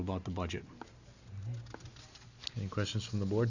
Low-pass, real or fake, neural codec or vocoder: 7.2 kHz; real; none